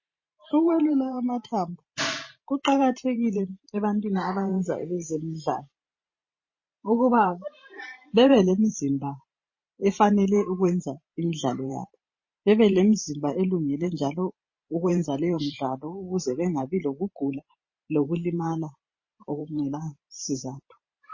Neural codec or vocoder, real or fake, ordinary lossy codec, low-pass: vocoder, 44.1 kHz, 128 mel bands every 512 samples, BigVGAN v2; fake; MP3, 32 kbps; 7.2 kHz